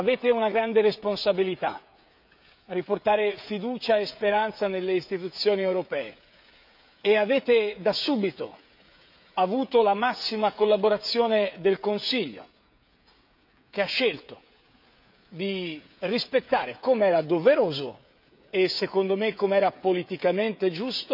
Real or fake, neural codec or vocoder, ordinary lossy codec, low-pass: fake; codec, 16 kHz, 16 kbps, FreqCodec, smaller model; none; 5.4 kHz